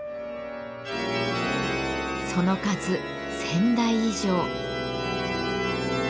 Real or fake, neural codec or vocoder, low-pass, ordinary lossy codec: real; none; none; none